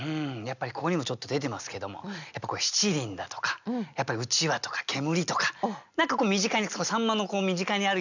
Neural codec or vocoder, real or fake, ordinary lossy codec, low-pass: none; real; none; 7.2 kHz